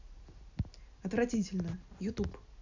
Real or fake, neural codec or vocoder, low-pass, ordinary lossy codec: real; none; 7.2 kHz; none